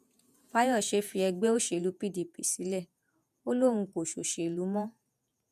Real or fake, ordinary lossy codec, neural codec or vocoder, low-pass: fake; none; vocoder, 48 kHz, 128 mel bands, Vocos; 14.4 kHz